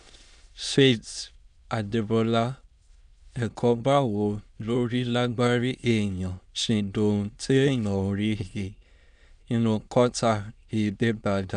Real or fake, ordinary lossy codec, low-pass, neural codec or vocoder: fake; none; 9.9 kHz; autoencoder, 22.05 kHz, a latent of 192 numbers a frame, VITS, trained on many speakers